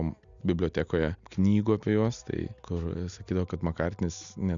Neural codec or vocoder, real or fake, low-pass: none; real; 7.2 kHz